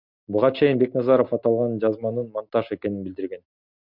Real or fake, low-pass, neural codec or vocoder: real; 5.4 kHz; none